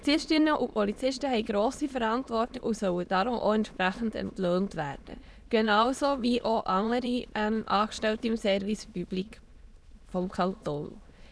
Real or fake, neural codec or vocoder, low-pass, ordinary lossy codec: fake; autoencoder, 22.05 kHz, a latent of 192 numbers a frame, VITS, trained on many speakers; none; none